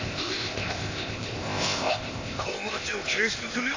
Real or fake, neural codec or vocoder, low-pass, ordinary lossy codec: fake; codec, 16 kHz, 0.8 kbps, ZipCodec; 7.2 kHz; AAC, 48 kbps